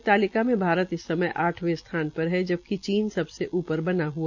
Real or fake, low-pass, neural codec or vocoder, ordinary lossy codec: real; 7.2 kHz; none; none